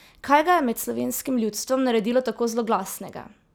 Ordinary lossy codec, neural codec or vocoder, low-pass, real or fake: none; none; none; real